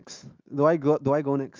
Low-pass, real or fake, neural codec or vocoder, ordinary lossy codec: 7.2 kHz; fake; autoencoder, 48 kHz, 128 numbers a frame, DAC-VAE, trained on Japanese speech; Opus, 24 kbps